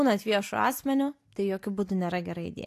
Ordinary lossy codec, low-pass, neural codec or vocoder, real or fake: AAC, 64 kbps; 14.4 kHz; none; real